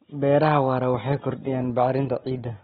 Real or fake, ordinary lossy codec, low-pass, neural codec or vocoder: real; AAC, 16 kbps; 7.2 kHz; none